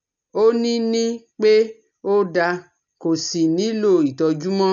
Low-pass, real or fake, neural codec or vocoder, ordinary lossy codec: 7.2 kHz; real; none; none